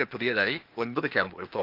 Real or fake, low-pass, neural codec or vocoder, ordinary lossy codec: fake; 5.4 kHz; codec, 16 kHz, 0.8 kbps, ZipCodec; Opus, 24 kbps